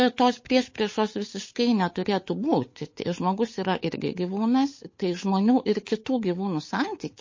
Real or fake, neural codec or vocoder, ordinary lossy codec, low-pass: fake; codec, 16 kHz, 8 kbps, FunCodec, trained on Chinese and English, 25 frames a second; MP3, 32 kbps; 7.2 kHz